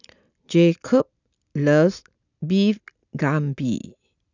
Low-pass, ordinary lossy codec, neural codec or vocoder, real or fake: 7.2 kHz; none; none; real